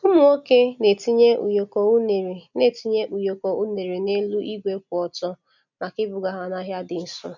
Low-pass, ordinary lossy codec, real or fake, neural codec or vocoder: 7.2 kHz; none; real; none